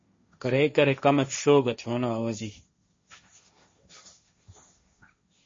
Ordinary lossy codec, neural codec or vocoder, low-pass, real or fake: MP3, 32 kbps; codec, 16 kHz, 1.1 kbps, Voila-Tokenizer; 7.2 kHz; fake